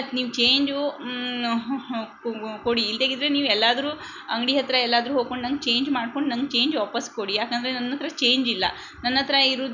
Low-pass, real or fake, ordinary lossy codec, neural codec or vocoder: 7.2 kHz; real; none; none